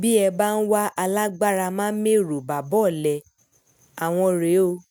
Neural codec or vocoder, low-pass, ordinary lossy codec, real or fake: none; none; none; real